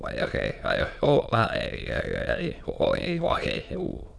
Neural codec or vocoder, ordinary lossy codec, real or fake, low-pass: autoencoder, 22.05 kHz, a latent of 192 numbers a frame, VITS, trained on many speakers; none; fake; none